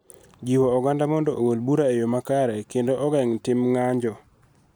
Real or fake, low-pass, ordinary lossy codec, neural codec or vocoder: real; none; none; none